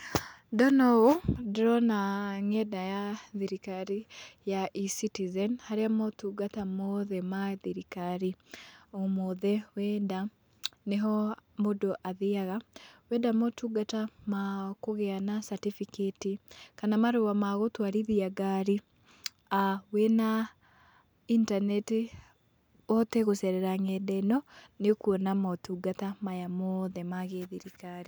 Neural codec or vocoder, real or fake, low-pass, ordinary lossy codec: none; real; none; none